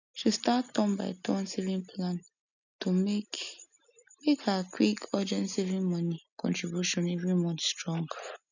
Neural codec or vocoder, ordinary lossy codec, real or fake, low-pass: none; none; real; 7.2 kHz